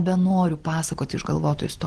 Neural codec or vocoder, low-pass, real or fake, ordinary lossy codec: none; 10.8 kHz; real; Opus, 16 kbps